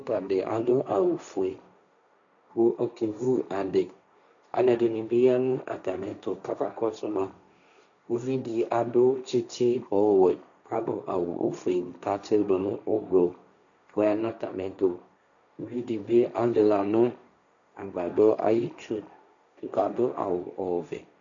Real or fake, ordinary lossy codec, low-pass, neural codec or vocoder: fake; MP3, 96 kbps; 7.2 kHz; codec, 16 kHz, 1.1 kbps, Voila-Tokenizer